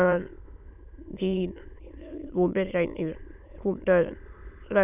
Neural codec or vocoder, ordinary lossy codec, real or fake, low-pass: autoencoder, 22.05 kHz, a latent of 192 numbers a frame, VITS, trained on many speakers; none; fake; 3.6 kHz